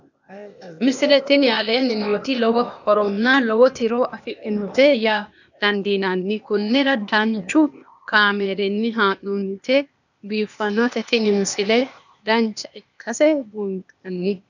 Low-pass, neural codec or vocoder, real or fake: 7.2 kHz; codec, 16 kHz, 0.8 kbps, ZipCodec; fake